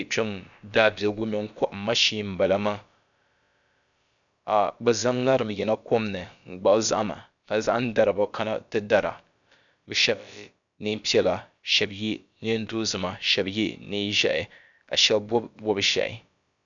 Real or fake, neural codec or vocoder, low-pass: fake; codec, 16 kHz, about 1 kbps, DyCAST, with the encoder's durations; 7.2 kHz